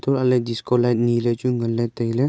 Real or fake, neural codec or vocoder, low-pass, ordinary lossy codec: real; none; none; none